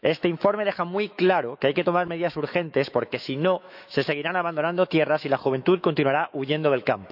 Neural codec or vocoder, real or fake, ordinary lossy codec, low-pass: autoencoder, 48 kHz, 128 numbers a frame, DAC-VAE, trained on Japanese speech; fake; none; 5.4 kHz